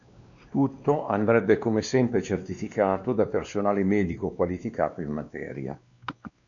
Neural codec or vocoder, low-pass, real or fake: codec, 16 kHz, 2 kbps, X-Codec, WavLM features, trained on Multilingual LibriSpeech; 7.2 kHz; fake